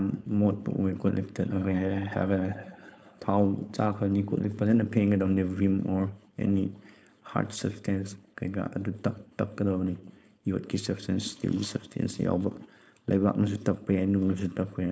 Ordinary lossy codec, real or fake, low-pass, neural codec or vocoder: none; fake; none; codec, 16 kHz, 4.8 kbps, FACodec